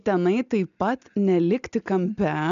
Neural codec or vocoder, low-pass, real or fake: none; 7.2 kHz; real